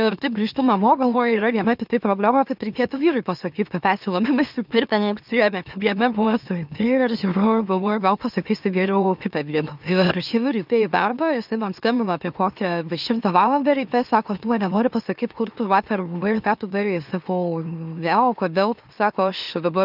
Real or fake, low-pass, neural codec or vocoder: fake; 5.4 kHz; autoencoder, 44.1 kHz, a latent of 192 numbers a frame, MeloTTS